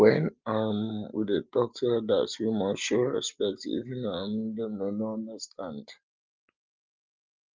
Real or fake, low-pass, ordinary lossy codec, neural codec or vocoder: real; 7.2 kHz; Opus, 32 kbps; none